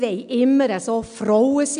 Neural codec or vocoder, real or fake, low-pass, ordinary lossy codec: none; real; 9.9 kHz; none